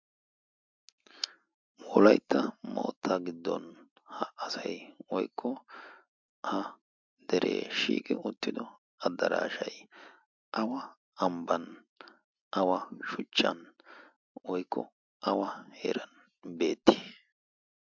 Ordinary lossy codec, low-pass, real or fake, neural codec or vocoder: MP3, 64 kbps; 7.2 kHz; real; none